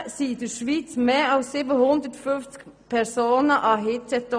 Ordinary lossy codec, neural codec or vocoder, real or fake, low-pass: none; none; real; 9.9 kHz